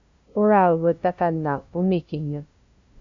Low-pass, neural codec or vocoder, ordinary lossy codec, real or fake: 7.2 kHz; codec, 16 kHz, 0.5 kbps, FunCodec, trained on LibriTTS, 25 frames a second; MP3, 64 kbps; fake